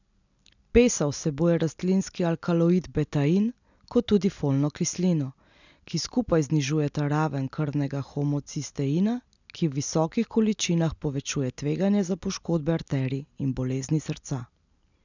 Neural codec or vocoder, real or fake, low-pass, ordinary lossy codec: none; real; 7.2 kHz; none